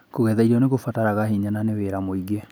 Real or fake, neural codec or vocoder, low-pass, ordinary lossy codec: real; none; none; none